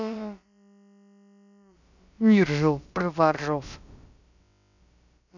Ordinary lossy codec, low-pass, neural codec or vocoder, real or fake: none; 7.2 kHz; codec, 16 kHz, about 1 kbps, DyCAST, with the encoder's durations; fake